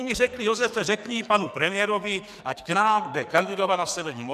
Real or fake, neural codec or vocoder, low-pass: fake; codec, 44.1 kHz, 2.6 kbps, SNAC; 14.4 kHz